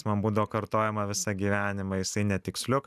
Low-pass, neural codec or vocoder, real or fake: 14.4 kHz; none; real